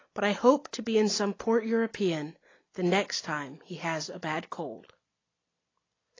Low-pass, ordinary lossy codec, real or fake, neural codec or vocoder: 7.2 kHz; AAC, 32 kbps; real; none